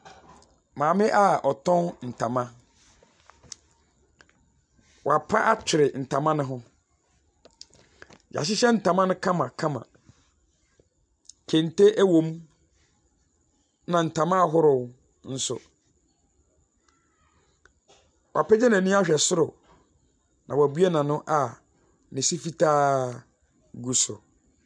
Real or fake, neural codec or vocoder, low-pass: real; none; 9.9 kHz